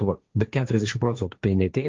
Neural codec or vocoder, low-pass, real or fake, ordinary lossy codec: codec, 16 kHz, 1.1 kbps, Voila-Tokenizer; 7.2 kHz; fake; Opus, 32 kbps